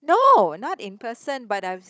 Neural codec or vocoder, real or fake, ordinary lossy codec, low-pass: codec, 16 kHz, 16 kbps, FunCodec, trained on Chinese and English, 50 frames a second; fake; none; none